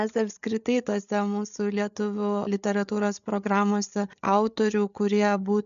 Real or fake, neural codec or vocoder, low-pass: fake; codec, 16 kHz, 8 kbps, FreqCodec, larger model; 7.2 kHz